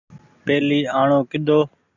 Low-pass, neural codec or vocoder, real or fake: 7.2 kHz; none; real